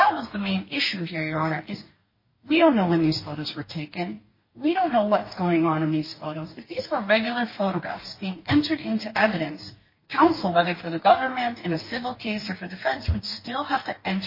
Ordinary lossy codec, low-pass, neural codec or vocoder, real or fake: MP3, 24 kbps; 5.4 kHz; codec, 44.1 kHz, 2.6 kbps, DAC; fake